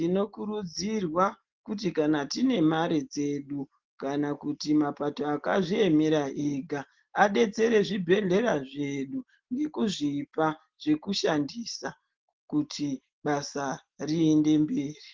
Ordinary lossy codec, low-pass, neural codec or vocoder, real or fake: Opus, 16 kbps; 7.2 kHz; none; real